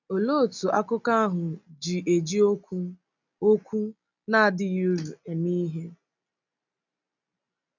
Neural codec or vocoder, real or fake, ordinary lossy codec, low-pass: none; real; none; 7.2 kHz